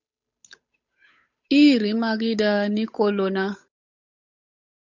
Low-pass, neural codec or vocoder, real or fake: 7.2 kHz; codec, 16 kHz, 8 kbps, FunCodec, trained on Chinese and English, 25 frames a second; fake